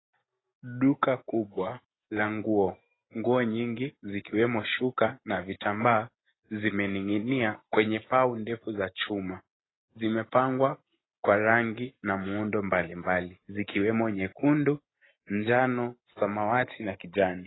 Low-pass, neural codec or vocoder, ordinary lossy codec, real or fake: 7.2 kHz; none; AAC, 16 kbps; real